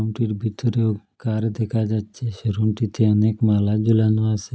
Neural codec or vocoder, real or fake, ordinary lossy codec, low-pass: none; real; none; none